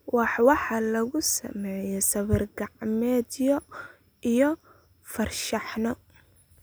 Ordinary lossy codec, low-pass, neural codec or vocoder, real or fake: none; none; none; real